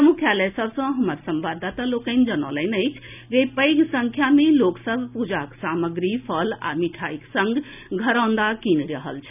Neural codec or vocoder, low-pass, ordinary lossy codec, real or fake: none; 3.6 kHz; none; real